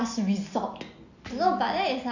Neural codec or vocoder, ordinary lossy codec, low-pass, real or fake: none; none; 7.2 kHz; real